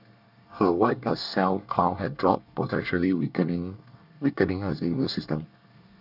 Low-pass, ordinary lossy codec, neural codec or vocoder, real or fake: 5.4 kHz; none; codec, 24 kHz, 1 kbps, SNAC; fake